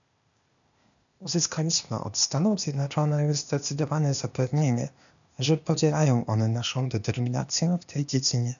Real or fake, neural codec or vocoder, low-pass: fake; codec, 16 kHz, 0.8 kbps, ZipCodec; 7.2 kHz